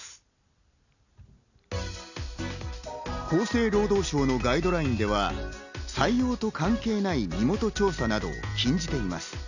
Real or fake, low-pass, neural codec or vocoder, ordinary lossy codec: real; 7.2 kHz; none; none